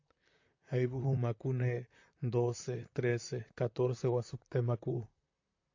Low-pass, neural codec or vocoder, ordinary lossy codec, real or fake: 7.2 kHz; vocoder, 44.1 kHz, 128 mel bands, Pupu-Vocoder; AAC, 48 kbps; fake